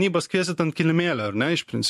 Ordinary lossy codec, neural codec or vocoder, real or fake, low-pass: MP3, 64 kbps; vocoder, 44.1 kHz, 128 mel bands every 512 samples, BigVGAN v2; fake; 14.4 kHz